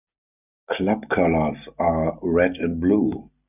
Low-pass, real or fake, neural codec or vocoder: 3.6 kHz; real; none